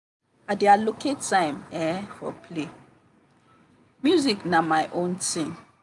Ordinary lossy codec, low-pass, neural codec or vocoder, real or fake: none; 10.8 kHz; none; real